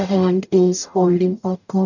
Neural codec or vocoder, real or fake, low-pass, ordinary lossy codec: codec, 44.1 kHz, 0.9 kbps, DAC; fake; 7.2 kHz; none